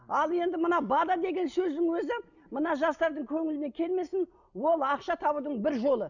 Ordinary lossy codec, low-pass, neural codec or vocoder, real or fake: none; 7.2 kHz; none; real